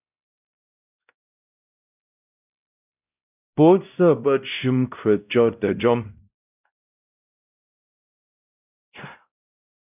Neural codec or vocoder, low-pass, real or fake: codec, 16 kHz, 0.5 kbps, X-Codec, WavLM features, trained on Multilingual LibriSpeech; 3.6 kHz; fake